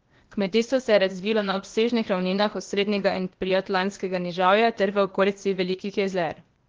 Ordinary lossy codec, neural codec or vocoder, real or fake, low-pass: Opus, 16 kbps; codec, 16 kHz, 0.8 kbps, ZipCodec; fake; 7.2 kHz